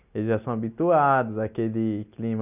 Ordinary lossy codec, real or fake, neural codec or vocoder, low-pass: none; real; none; 3.6 kHz